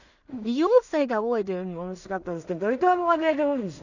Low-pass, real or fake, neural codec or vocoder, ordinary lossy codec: 7.2 kHz; fake; codec, 16 kHz in and 24 kHz out, 0.4 kbps, LongCat-Audio-Codec, two codebook decoder; none